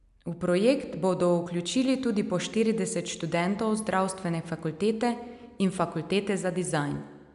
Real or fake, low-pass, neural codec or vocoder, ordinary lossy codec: real; 10.8 kHz; none; none